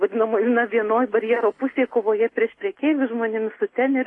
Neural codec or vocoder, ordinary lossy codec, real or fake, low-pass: none; AAC, 32 kbps; real; 10.8 kHz